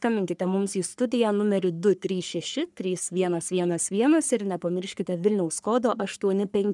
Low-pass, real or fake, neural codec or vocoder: 10.8 kHz; fake; codec, 44.1 kHz, 3.4 kbps, Pupu-Codec